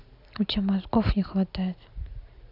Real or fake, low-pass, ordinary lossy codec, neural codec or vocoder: real; 5.4 kHz; none; none